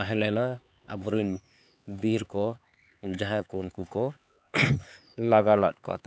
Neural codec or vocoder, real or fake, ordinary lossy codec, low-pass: codec, 16 kHz, 4 kbps, X-Codec, HuBERT features, trained on LibriSpeech; fake; none; none